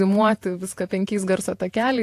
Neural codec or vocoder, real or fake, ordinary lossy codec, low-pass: vocoder, 48 kHz, 128 mel bands, Vocos; fake; AAC, 64 kbps; 14.4 kHz